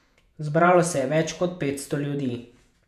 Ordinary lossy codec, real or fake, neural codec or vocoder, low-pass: none; fake; vocoder, 48 kHz, 128 mel bands, Vocos; 14.4 kHz